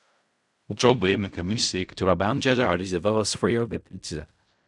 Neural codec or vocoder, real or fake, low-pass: codec, 16 kHz in and 24 kHz out, 0.4 kbps, LongCat-Audio-Codec, fine tuned four codebook decoder; fake; 10.8 kHz